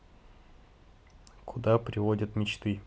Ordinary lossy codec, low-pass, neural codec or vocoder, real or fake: none; none; none; real